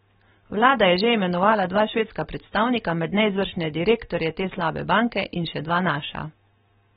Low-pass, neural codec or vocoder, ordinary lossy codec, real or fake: 7.2 kHz; none; AAC, 16 kbps; real